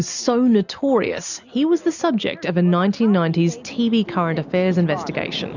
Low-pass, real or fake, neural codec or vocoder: 7.2 kHz; real; none